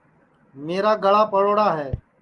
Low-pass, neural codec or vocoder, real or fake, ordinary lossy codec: 10.8 kHz; none; real; Opus, 24 kbps